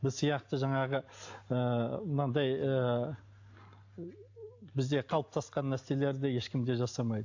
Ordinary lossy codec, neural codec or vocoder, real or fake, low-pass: AAC, 48 kbps; none; real; 7.2 kHz